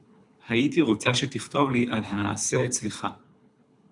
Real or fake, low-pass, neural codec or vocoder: fake; 10.8 kHz; codec, 24 kHz, 3 kbps, HILCodec